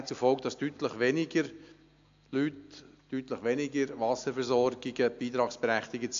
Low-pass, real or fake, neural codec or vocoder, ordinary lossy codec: 7.2 kHz; real; none; AAC, 64 kbps